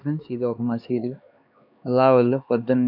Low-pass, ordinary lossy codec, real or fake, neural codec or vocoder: 5.4 kHz; none; fake; codec, 16 kHz, 2 kbps, X-Codec, HuBERT features, trained on LibriSpeech